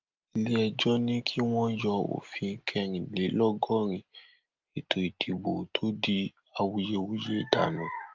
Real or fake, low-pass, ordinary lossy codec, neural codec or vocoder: real; 7.2 kHz; Opus, 32 kbps; none